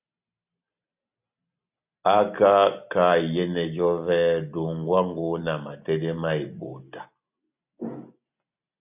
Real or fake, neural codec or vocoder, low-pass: real; none; 3.6 kHz